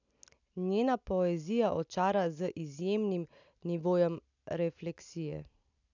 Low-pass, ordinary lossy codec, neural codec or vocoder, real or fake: 7.2 kHz; none; none; real